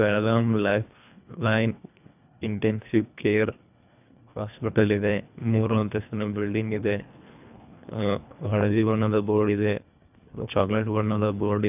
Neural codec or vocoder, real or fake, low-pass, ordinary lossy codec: codec, 24 kHz, 1.5 kbps, HILCodec; fake; 3.6 kHz; none